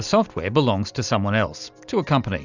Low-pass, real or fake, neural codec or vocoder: 7.2 kHz; real; none